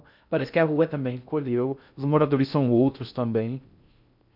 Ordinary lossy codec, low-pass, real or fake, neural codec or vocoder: none; 5.4 kHz; fake; codec, 16 kHz in and 24 kHz out, 0.6 kbps, FocalCodec, streaming, 2048 codes